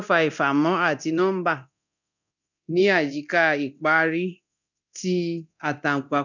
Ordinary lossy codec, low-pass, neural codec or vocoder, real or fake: none; 7.2 kHz; codec, 24 kHz, 0.9 kbps, DualCodec; fake